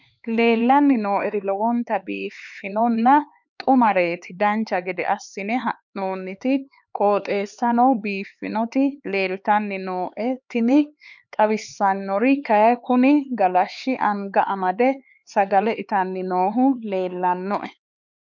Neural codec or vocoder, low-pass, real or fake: codec, 16 kHz, 4 kbps, X-Codec, HuBERT features, trained on LibriSpeech; 7.2 kHz; fake